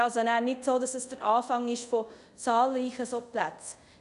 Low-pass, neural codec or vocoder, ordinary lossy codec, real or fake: 10.8 kHz; codec, 24 kHz, 0.5 kbps, DualCodec; none; fake